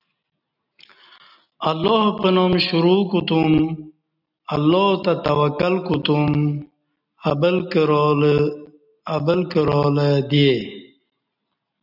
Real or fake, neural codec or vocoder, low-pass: real; none; 5.4 kHz